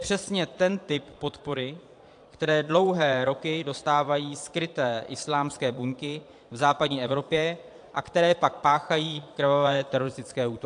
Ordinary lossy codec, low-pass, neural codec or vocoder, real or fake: AAC, 64 kbps; 9.9 kHz; vocoder, 22.05 kHz, 80 mel bands, Vocos; fake